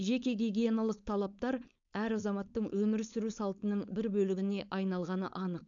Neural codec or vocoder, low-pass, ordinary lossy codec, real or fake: codec, 16 kHz, 4.8 kbps, FACodec; 7.2 kHz; none; fake